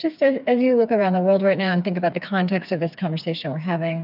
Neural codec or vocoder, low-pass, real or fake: codec, 16 kHz, 4 kbps, FreqCodec, smaller model; 5.4 kHz; fake